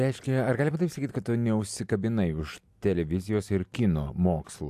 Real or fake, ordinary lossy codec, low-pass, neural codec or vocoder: real; AAC, 96 kbps; 14.4 kHz; none